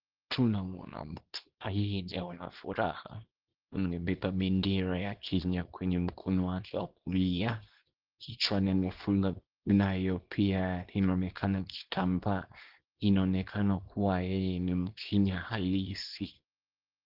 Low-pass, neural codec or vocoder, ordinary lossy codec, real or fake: 5.4 kHz; codec, 24 kHz, 0.9 kbps, WavTokenizer, small release; Opus, 16 kbps; fake